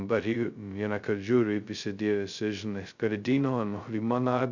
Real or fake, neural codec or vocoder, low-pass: fake; codec, 16 kHz, 0.2 kbps, FocalCodec; 7.2 kHz